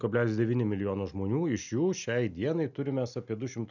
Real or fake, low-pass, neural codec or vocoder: real; 7.2 kHz; none